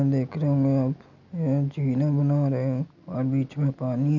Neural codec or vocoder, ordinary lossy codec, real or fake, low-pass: none; none; real; 7.2 kHz